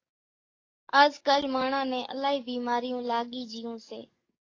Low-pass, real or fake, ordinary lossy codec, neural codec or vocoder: 7.2 kHz; fake; AAC, 32 kbps; codec, 44.1 kHz, 7.8 kbps, DAC